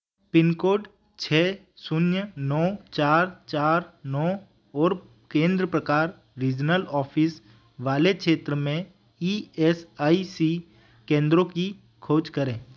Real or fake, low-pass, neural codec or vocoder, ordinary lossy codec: real; none; none; none